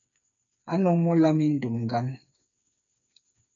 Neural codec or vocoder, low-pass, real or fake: codec, 16 kHz, 4 kbps, FreqCodec, smaller model; 7.2 kHz; fake